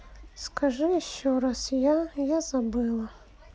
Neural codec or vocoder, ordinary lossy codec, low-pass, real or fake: none; none; none; real